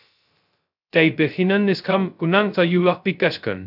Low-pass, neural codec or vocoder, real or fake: 5.4 kHz; codec, 16 kHz, 0.2 kbps, FocalCodec; fake